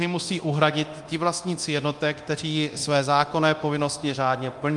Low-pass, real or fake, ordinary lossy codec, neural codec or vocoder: 10.8 kHz; fake; Opus, 32 kbps; codec, 24 kHz, 0.9 kbps, DualCodec